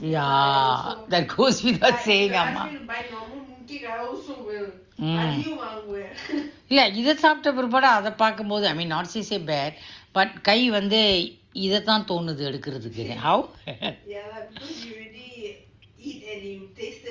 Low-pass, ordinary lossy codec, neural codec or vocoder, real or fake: 7.2 kHz; Opus, 32 kbps; none; real